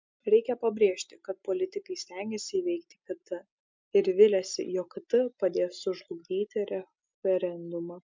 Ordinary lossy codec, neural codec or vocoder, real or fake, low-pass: MP3, 64 kbps; none; real; 7.2 kHz